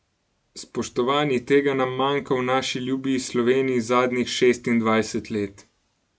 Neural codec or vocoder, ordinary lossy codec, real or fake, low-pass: none; none; real; none